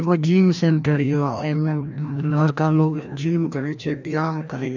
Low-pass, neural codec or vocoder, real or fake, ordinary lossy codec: 7.2 kHz; codec, 16 kHz, 1 kbps, FreqCodec, larger model; fake; none